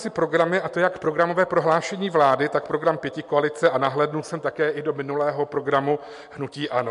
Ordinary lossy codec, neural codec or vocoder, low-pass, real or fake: MP3, 64 kbps; none; 10.8 kHz; real